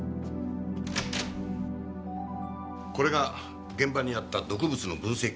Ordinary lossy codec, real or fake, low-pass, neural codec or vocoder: none; real; none; none